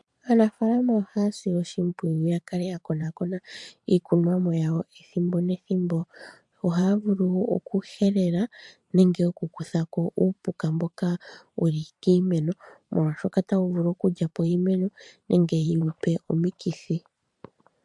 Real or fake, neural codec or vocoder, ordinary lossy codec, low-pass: fake; vocoder, 44.1 kHz, 128 mel bands every 512 samples, BigVGAN v2; MP3, 64 kbps; 10.8 kHz